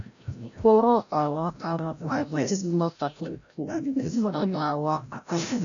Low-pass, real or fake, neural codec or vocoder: 7.2 kHz; fake; codec, 16 kHz, 0.5 kbps, FreqCodec, larger model